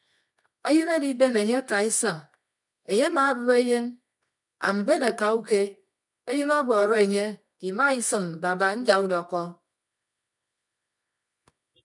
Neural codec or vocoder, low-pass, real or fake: codec, 24 kHz, 0.9 kbps, WavTokenizer, medium music audio release; 10.8 kHz; fake